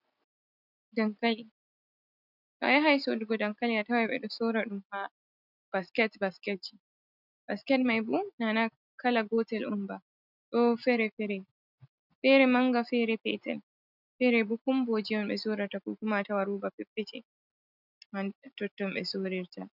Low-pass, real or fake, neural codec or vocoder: 5.4 kHz; real; none